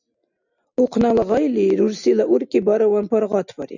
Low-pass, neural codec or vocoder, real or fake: 7.2 kHz; none; real